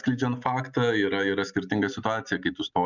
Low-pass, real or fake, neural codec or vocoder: 7.2 kHz; real; none